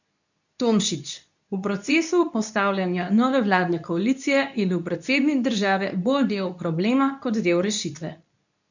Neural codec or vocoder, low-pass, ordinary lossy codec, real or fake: codec, 24 kHz, 0.9 kbps, WavTokenizer, medium speech release version 2; 7.2 kHz; none; fake